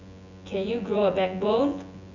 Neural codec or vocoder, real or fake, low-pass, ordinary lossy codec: vocoder, 24 kHz, 100 mel bands, Vocos; fake; 7.2 kHz; none